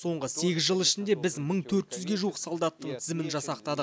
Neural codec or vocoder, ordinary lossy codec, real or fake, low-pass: none; none; real; none